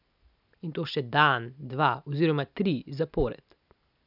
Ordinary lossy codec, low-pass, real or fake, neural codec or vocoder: none; 5.4 kHz; real; none